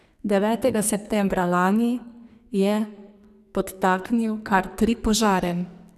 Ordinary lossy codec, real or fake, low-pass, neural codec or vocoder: none; fake; 14.4 kHz; codec, 44.1 kHz, 2.6 kbps, SNAC